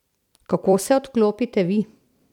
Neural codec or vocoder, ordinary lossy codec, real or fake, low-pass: vocoder, 44.1 kHz, 128 mel bands every 256 samples, BigVGAN v2; none; fake; 19.8 kHz